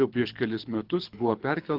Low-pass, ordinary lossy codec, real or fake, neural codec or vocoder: 5.4 kHz; Opus, 16 kbps; fake; codec, 24 kHz, 6 kbps, HILCodec